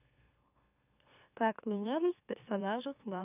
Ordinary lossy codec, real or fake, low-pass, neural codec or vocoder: none; fake; 3.6 kHz; autoencoder, 44.1 kHz, a latent of 192 numbers a frame, MeloTTS